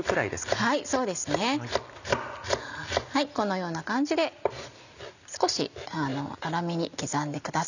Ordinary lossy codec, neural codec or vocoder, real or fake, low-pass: none; none; real; 7.2 kHz